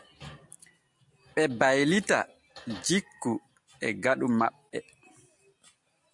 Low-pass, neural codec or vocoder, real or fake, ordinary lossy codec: 10.8 kHz; none; real; MP3, 64 kbps